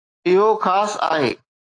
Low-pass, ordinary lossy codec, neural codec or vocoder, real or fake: 9.9 kHz; AAC, 32 kbps; autoencoder, 48 kHz, 128 numbers a frame, DAC-VAE, trained on Japanese speech; fake